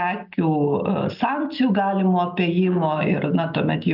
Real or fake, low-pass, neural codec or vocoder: real; 5.4 kHz; none